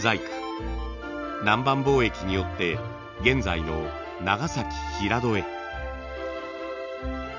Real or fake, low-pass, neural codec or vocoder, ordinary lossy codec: real; 7.2 kHz; none; none